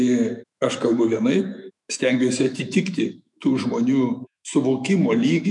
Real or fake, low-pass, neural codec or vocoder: fake; 10.8 kHz; autoencoder, 48 kHz, 128 numbers a frame, DAC-VAE, trained on Japanese speech